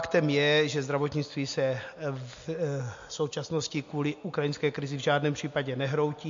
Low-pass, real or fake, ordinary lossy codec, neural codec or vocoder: 7.2 kHz; real; MP3, 48 kbps; none